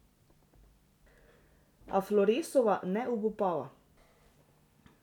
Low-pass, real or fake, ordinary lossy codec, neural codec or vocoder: 19.8 kHz; real; none; none